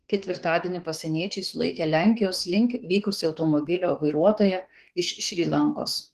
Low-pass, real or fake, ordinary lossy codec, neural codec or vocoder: 14.4 kHz; fake; Opus, 16 kbps; autoencoder, 48 kHz, 32 numbers a frame, DAC-VAE, trained on Japanese speech